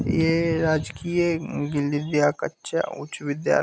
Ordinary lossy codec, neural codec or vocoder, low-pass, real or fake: none; none; none; real